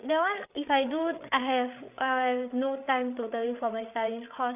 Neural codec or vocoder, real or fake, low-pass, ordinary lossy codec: codec, 16 kHz, 8 kbps, FreqCodec, larger model; fake; 3.6 kHz; none